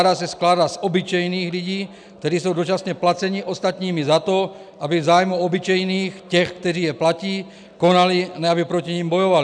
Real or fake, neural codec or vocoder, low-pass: real; none; 9.9 kHz